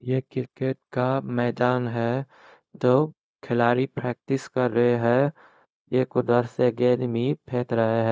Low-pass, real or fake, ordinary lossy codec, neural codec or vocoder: none; fake; none; codec, 16 kHz, 0.4 kbps, LongCat-Audio-Codec